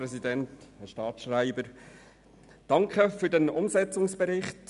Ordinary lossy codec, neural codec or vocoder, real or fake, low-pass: none; none; real; 10.8 kHz